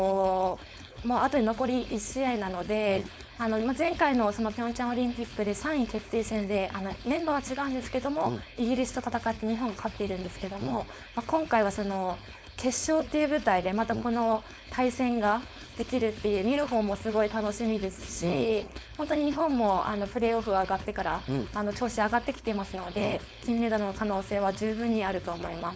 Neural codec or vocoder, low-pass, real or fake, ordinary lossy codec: codec, 16 kHz, 4.8 kbps, FACodec; none; fake; none